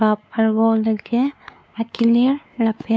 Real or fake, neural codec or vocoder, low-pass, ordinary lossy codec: fake; codec, 16 kHz, 4 kbps, X-Codec, WavLM features, trained on Multilingual LibriSpeech; none; none